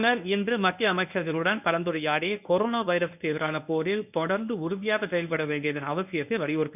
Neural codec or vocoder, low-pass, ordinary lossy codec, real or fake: codec, 24 kHz, 0.9 kbps, WavTokenizer, medium speech release version 1; 3.6 kHz; none; fake